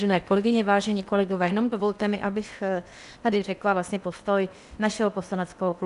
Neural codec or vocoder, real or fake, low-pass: codec, 16 kHz in and 24 kHz out, 0.8 kbps, FocalCodec, streaming, 65536 codes; fake; 10.8 kHz